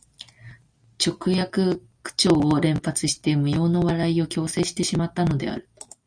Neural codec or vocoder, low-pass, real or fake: none; 9.9 kHz; real